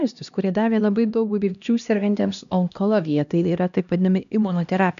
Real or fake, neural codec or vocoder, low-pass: fake; codec, 16 kHz, 1 kbps, X-Codec, HuBERT features, trained on LibriSpeech; 7.2 kHz